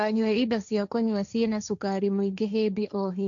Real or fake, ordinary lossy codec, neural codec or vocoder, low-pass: fake; none; codec, 16 kHz, 1.1 kbps, Voila-Tokenizer; 7.2 kHz